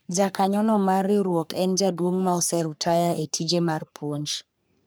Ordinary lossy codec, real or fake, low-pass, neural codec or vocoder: none; fake; none; codec, 44.1 kHz, 3.4 kbps, Pupu-Codec